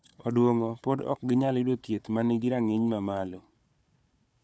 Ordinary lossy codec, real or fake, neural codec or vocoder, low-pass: none; fake; codec, 16 kHz, 4 kbps, FunCodec, trained on Chinese and English, 50 frames a second; none